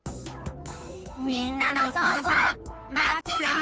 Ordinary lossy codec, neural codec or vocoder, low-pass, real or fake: none; codec, 16 kHz, 2 kbps, FunCodec, trained on Chinese and English, 25 frames a second; none; fake